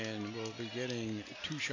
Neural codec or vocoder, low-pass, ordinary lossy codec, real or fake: none; 7.2 kHz; AAC, 48 kbps; real